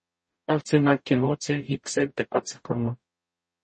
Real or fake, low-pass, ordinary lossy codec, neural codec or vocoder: fake; 10.8 kHz; MP3, 32 kbps; codec, 44.1 kHz, 0.9 kbps, DAC